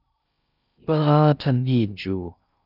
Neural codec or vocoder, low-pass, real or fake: codec, 16 kHz in and 24 kHz out, 0.6 kbps, FocalCodec, streaming, 4096 codes; 5.4 kHz; fake